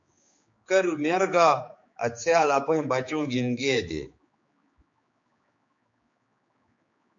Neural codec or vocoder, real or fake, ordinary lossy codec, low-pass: codec, 16 kHz, 4 kbps, X-Codec, HuBERT features, trained on general audio; fake; MP3, 48 kbps; 7.2 kHz